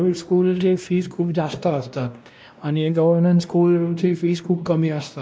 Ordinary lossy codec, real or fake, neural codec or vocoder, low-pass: none; fake; codec, 16 kHz, 1 kbps, X-Codec, WavLM features, trained on Multilingual LibriSpeech; none